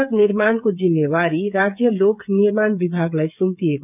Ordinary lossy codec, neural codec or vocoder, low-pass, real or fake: none; codec, 16 kHz, 8 kbps, FreqCodec, smaller model; 3.6 kHz; fake